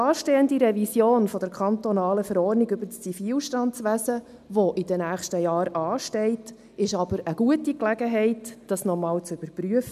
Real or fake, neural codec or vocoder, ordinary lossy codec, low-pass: real; none; none; 14.4 kHz